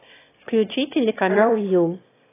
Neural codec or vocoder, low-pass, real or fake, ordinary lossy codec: autoencoder, 22.05 kHz, a latent of 192 numbers a frame, VITS, trained on one speaker; 3.6 kHz; fake; AAC, 16 kbps